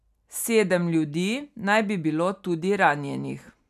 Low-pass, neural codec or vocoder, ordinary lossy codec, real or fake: 14.4 kHz; none; none; real